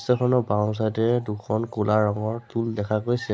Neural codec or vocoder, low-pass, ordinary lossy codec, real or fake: none; none; none; real